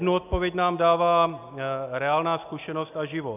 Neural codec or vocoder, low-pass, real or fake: none; 3.6 kHz; real